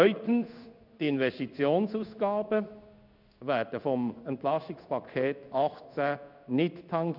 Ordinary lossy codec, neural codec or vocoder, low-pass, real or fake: none; codec, 16 kHz in and 24 kHz out, 1 kbps, XY-Tokenizer; 5.4 kHz; fake